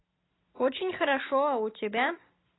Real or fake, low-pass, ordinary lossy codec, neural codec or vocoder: real; 7.2 kHz; AAC, 16 kbps; none